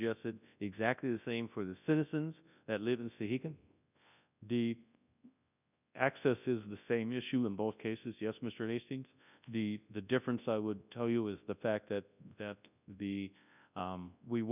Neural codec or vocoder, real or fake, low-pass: codec, 24 kHz, 0.9 kbps, WavTokenizer, large speech release; fake; 3.6 kHz